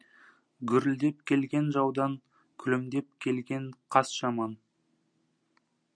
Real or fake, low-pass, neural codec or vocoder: real; 9.9 kHz; none